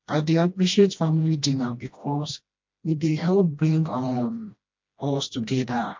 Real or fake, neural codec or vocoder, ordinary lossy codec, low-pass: fake; codec, 16 kHz, 1 kbps, FreqCodec, smaller model; MP3, 48 kbps; 7.2 kHz